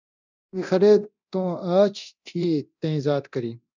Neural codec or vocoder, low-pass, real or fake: codec, 24 kHz, 0.9 kbps, DualCodec; 7.2 kHz; fake